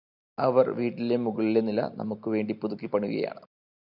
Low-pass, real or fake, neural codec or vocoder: 5.4 kHz; real; none